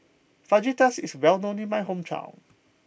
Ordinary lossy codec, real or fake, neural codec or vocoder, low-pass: none; real; none; none